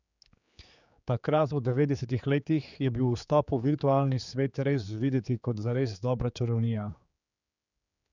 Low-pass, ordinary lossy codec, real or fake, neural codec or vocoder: 7.2 kHz; none; fake; codec, 16 kHz, 4 kbps, X-Codec, HuBERT features, trained on general audio